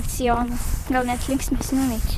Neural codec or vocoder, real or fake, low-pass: vocoder, 44.1 kHz, 128 mel bands, Pupu-Vocoder; fake; 14.4 kHz